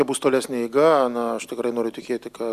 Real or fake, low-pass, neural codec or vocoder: real; 14.4 kHz; none